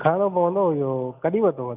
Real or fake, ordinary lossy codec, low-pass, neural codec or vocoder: real; none; 3.6 kHz; none